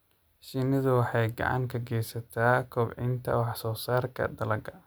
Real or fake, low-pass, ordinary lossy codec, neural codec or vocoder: real; none; none; none